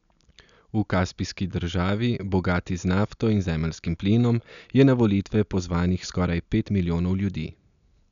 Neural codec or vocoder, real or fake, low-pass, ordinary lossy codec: none; real; 7.2 kHz; none